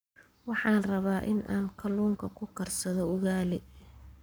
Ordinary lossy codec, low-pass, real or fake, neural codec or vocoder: none; none; fake; codec, 44.1 kHz, 7.8 kbps, Pupu-Codec